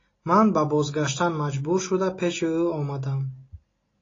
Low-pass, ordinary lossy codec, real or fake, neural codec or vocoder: 7.2 kHz; AAC, 32 kbps; real; none